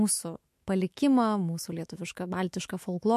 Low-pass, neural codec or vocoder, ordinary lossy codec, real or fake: 14.4 kHz; autoencoder, 48 kHz, 128 numbers a frame, DAC-VAE, trained on Japanese speech; MP3, 64 kbps; fake